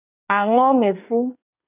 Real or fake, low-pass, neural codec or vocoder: fake; 3.6 kHz; codec, 24 kHz, 1 kbps, SNAC